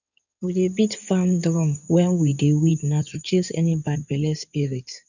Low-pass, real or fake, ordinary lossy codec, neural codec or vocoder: 7.2 kHz; fake; none; codec, 16 kHz in and 24 kHz out, 2.2 kbps, FireRedTTS-2 codec